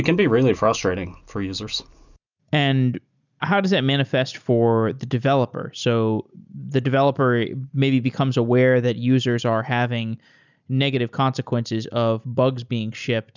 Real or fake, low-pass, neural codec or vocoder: real; 7.2 kHz; none